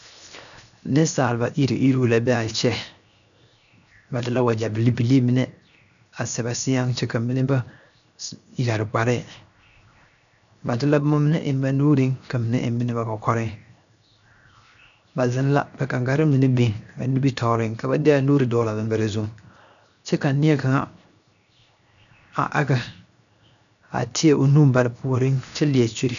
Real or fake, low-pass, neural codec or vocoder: fake; 7.2 kHz; codec, 16 kHz, 0.7 kbps, FocalCodec